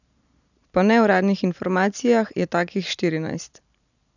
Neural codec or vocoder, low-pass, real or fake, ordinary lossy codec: none; 7.2 kHz; real; none